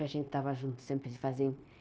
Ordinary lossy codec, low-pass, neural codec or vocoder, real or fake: none; none; codec, 16 kHz, 0.9 kbps, LongCat-Audio-Codec; fake